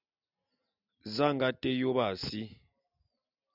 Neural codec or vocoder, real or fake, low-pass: none; real; 5.4 kHz